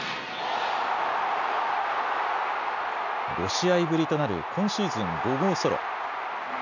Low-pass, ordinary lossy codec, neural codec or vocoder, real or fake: 7.2 kHz; none; none; real